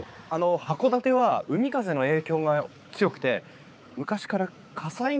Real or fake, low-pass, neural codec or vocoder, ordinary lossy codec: fake; none; codec, 16 kHz, 4 kbps, X-Codec, HuBERT features, trained on balanced general audio; none